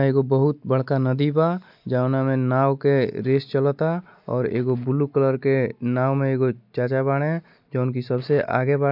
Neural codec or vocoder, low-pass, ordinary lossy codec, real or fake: none; 5.4 kHz; MP3, 48 kbps; real